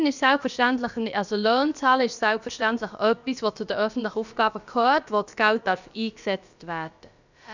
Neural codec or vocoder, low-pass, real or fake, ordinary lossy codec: codec, 16 kHz, about 1 kbps, DyCAST, with the encoder's durations; 7.2 kHz; fake; none